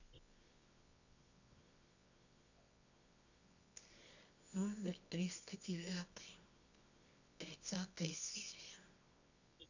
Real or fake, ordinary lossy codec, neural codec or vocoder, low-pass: fake; none; codec, 24 kHz, 0.9 kbps, WavTokenizer, medium music audio release; 7.2 kHz